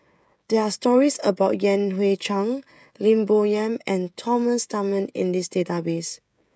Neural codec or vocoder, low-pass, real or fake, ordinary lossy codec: codec, 16 kHz, 16 kbps, FreqCodec, smaller model; none; fake; none